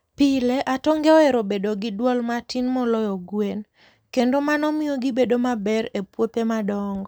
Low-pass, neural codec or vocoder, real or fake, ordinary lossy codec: none; none; real; none